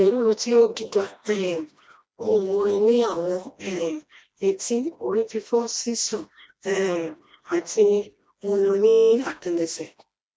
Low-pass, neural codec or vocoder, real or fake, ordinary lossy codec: none; codec, 16 kHz, 1 kbps, FreqCodec, smaller model; fake; none